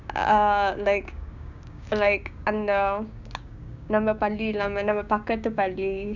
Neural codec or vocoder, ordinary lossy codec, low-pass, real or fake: codec, 16 kHz, 6 kbps, DAC; none; 7.2 kHz; fake